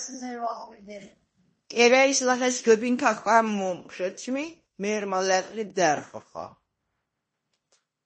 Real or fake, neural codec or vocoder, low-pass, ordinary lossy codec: fake; codec, 16 kHz in and 24 kHz out, 0.9 kbps, LongCat-Audio-Codec, fine tuned four codebook decoder; 10.8 kHz; MP3, 32 kbps